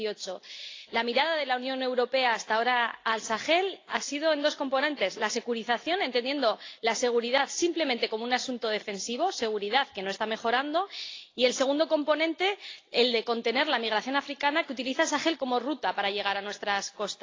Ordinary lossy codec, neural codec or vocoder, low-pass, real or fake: AAC, 32 kbps; none; 7.2 kHz; real